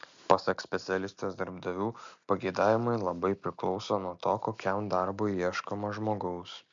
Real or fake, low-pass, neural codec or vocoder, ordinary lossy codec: real; 7.2 kHz; none; MP3, 64 kbps